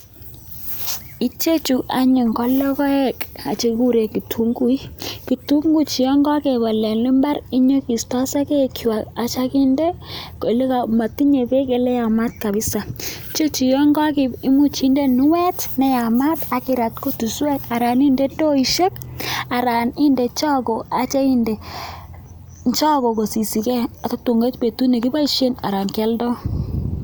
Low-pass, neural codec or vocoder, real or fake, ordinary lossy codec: none; none; real; none